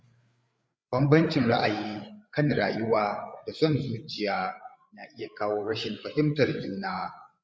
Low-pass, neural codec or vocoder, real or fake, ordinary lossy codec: none; codec, 16 kHz, 8 kbps, FreqCodec, larger model; fake; none